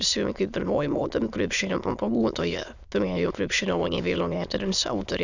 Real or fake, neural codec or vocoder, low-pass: fake; autoencoder, 22.05 kHz, a latent of 192 numbers a frame, VITS, trained on many speakers; 7.2 kHz